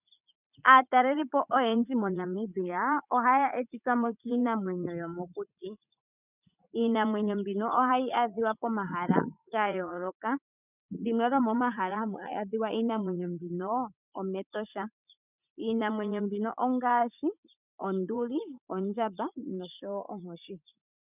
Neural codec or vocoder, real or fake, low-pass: vocoder, 44.1 kHz, 80 mel bands, Vocos; fake; 3.6 kHz